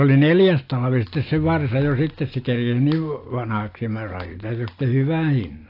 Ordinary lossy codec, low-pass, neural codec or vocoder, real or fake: AAC, 32 kbps; 5.4 kHz; none; real